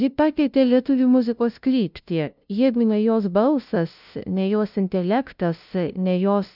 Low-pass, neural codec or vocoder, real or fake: 5.4 kHz; codec, 16 kHz, 0.5 kbps, FunCodec, trained on LibriTTS, 25 frames a second; fake